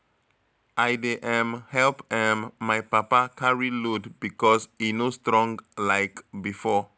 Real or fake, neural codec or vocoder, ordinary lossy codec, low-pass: real; none; none; none